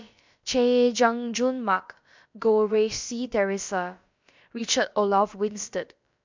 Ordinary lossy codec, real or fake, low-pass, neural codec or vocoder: MP3, 64 kbps; fake; 7.2 kHz; codec, 16 kHz, about 1 kbps, DyCAST, with the encoder's durations